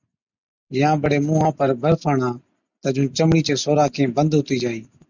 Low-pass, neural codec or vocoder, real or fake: 7.2 kHz; none; real